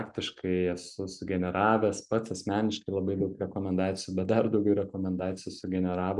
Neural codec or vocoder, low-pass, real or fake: none; 10.8 kHz; real